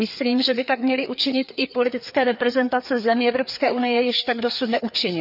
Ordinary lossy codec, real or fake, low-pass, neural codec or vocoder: none; fake; 5.4 kHz; codec, 16 kHz, 4 kbps, FreqCodec, larger model